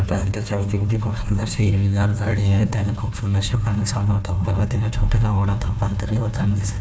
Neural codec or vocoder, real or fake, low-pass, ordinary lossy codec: codec, 16 kHz, 1 kbps, FunCodec, trained on Chinese and English, 50 frames a second; fake; none; none